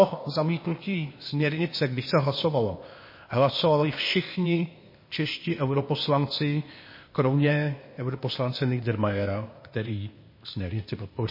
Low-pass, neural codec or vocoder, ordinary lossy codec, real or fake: 5.4 kHz; codec, 16 kHz, 0.8 kbps, ZipCodec; MP3, 24 kbps; fake